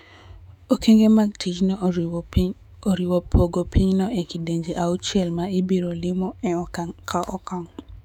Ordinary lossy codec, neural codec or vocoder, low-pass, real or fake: none; autoencoder, 48 kHz, 128 numbers a frame, DAC-VAE, trained on Japanese speech; 19.8 kHz; fake